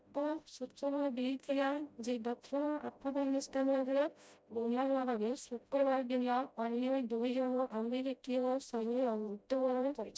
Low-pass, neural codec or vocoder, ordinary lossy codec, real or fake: none; codec, 16 kHz, 0.5 kbps, FreqCodec, smaller model; none; fake